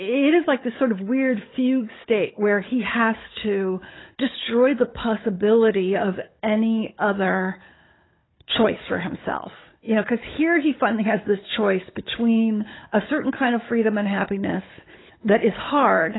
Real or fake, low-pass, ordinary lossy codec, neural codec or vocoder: fake; 7.2 kHz; AAC, 16 kbps; codec, 16 kHz, 16 kbps, FunCodec, trained on Chinese and English, 50 frames a second